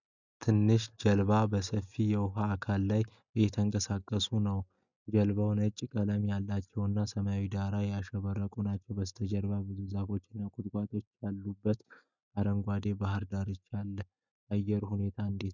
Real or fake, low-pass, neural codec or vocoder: real; 7.2 kHz; none